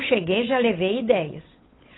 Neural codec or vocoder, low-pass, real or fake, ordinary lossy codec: codec, 16 kHz, 8 kbps, FunCodec, trained on Chinese and English, 25 frames a second; 7.2 kHz; fake; AAC, 16 kbps